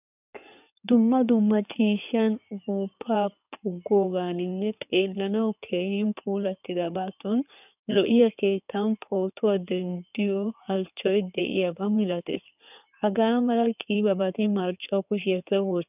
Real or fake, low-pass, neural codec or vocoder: fake; 3.6 kHz; codec, 16 kHz in and 24 kHz out, 2.2 kbps, FireRedTTS-2 codec